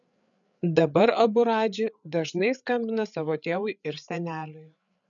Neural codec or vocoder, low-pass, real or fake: codec, 16 kHz, 8 kbps, FreqCodec, larger model; 7.2 kHz; fake